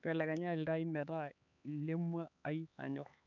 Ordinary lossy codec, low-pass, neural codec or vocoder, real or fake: none; 7.2 kHz; codec, 16 kHz, 4 kbps, X-Codec, HuBERT features, trained on LibriSpeech; fake